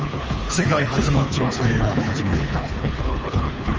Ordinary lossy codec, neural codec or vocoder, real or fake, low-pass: Opus, 24 kbps; codec, 24 kHz, 3 kbps, HILCodec; fake; 7.2 kHz